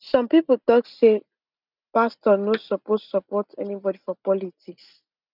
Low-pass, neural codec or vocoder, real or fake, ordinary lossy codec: 5.4 kHz; none; real; none